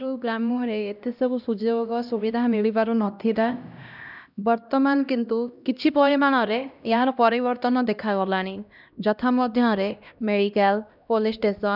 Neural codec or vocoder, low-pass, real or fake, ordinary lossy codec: codec, 16 kHz, 1 kbps, X-Codec, HuBERT features, trained on LibriSpeech; 5.4 kHz; fake; none